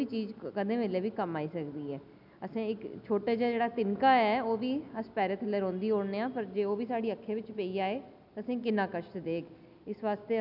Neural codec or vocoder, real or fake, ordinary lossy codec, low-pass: none; real; none; 5.4 kHz